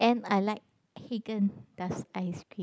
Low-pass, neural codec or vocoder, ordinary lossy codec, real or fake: none; none; none; real